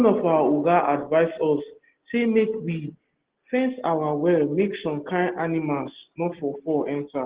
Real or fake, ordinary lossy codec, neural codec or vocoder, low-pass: real; Opus, 16 kbps; none; 3.6 kHz